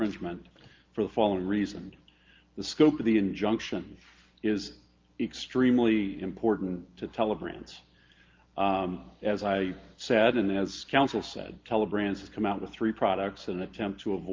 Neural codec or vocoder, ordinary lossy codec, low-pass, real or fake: none; Opus, 32 kbps; 7.2 kHz; real